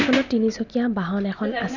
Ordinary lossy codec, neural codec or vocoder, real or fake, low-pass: none; none; real; 7.2 kHz